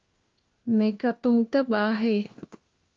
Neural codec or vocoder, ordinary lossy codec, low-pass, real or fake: codec, 16 kHz, 1 kbps, FunCodec, trained on LibriTTS, 50 frames a second; Opus, 32 kbps; 7.2 kHz; fake